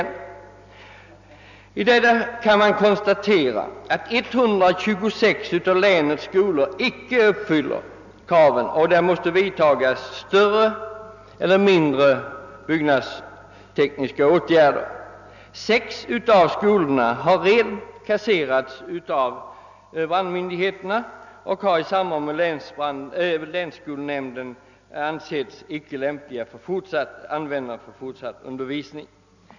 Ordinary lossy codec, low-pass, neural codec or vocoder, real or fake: none; 7.2 kHz; none; real